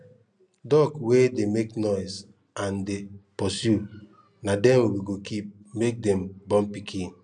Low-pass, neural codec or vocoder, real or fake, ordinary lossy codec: 9.9 kHz; none; real; none